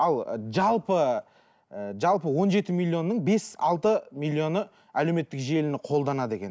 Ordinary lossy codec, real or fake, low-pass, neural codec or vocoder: none; real; none; none